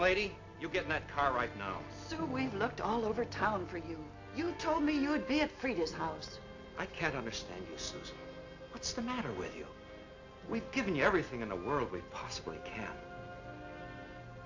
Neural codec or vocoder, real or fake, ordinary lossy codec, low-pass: none; real; AAC, 48 kbps; 7.2 kHz